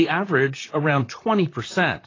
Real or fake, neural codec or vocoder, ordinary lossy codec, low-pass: fake; vocoder, 44.1 kHz, 128 mel bands, Pupu-Vocoder; AAC, 32 kbps; 7.2 kHz